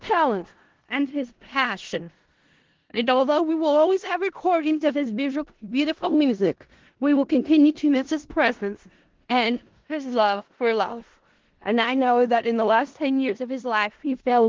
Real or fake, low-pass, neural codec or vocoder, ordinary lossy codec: fake; 7.2 kHz; codec, 16 kHz in and 24 kHz out, 0.4 kbps, LongCat-Audio-Codec, four codebook decoder; Opus, 16 kbps